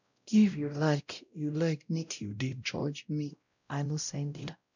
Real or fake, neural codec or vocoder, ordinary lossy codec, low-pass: fake; codec, 16 kHz, 0.5 kbps, X-Codec, WavLM features, trained on Multilingual LibriSpeech; none; 7.2 kHz